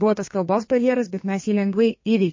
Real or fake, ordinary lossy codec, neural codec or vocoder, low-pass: fake; MP3, 32 kbps; codec, 32 kHz, 1.9 kbps, SNAC; 7.2 kHz